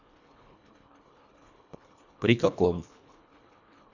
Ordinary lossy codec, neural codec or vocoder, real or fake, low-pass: none; codec, 24 kHz, 1.5 kbps, HILCodec; fake; 7.2 kHz